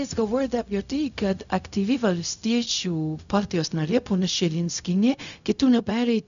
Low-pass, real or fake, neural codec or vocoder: 7.2 kHz; fake; codec, 16 kHz, 0.4 kbps, LongCat-Audio-Codec